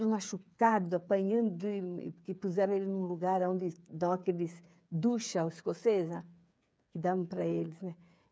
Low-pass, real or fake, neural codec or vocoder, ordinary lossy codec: none; fake; codec, 16 kHz, 8 kbps, FreqCodec, smaller model; none